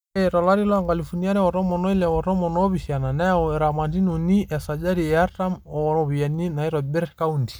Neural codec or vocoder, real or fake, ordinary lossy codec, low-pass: none; real; none; none